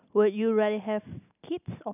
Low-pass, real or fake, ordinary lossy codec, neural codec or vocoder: 3.6 kHz; real; none; none